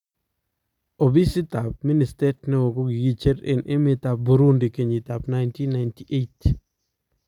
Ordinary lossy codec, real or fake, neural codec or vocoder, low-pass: none; real; none; 19.8 kHz